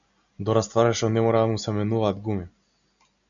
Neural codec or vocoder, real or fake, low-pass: none; real; 7.2 kHz